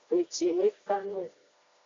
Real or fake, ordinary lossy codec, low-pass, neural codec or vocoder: fake; AAC, 32 kbps; 7.2 kHz; codec, 16 kHz, 1 kbps, FreqCodec, smaller model